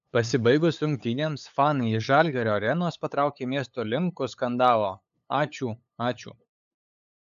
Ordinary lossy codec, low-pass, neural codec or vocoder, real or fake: AAC, 96 kbps; 7.2 kHz; codec, 16 kHz, 8 kbps, FunCodec, trained on LibriTTS, 25 frames a second; fake